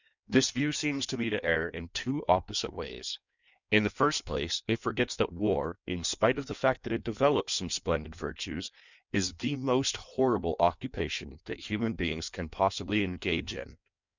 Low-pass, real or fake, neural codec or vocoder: 7.2 kHz; fake; codec, 16 kHz in and 24 kHz out, 1.1 kbps, FireRedTTS-2 codec